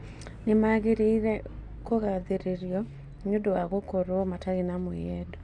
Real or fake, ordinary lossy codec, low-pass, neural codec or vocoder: real; none; 10.8 kHz; none